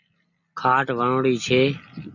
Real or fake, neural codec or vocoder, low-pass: real; none; 7.2 kHz